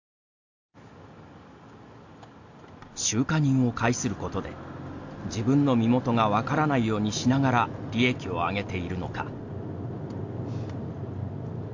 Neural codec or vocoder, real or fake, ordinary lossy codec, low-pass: none; real; AAC, 48 kbps; 7.2 kHz